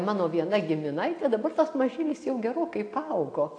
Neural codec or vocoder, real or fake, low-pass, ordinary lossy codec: none; real; 9.9 kHz; AAC, 48 kbps